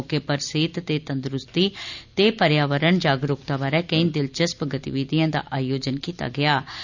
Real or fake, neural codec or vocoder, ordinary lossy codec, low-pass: real; none; none; 7.2 kHz